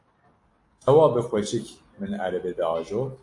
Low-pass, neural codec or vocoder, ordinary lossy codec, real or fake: 10.8 kHz; none; AAC, 48 kbps; real